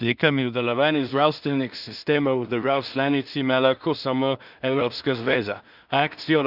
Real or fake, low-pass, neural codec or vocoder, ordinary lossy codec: fake; 5.4 kHz; codec, 16 kHz in and 24 kHz out, 0.4 kbps, LongCat-Audio-Codec, two codebook decoder; Opus, 64 kbps